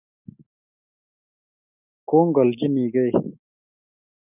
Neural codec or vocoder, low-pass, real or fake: none; 3.6 kHz; real